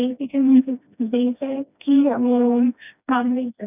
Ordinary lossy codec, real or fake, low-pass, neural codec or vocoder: none; fake; 3.6 kHz; codec, 16 kHz, 1 kbps, FreqCodec, smaller model